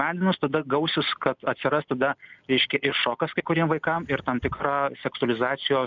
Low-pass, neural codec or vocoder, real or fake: 7.2 kHz; none; real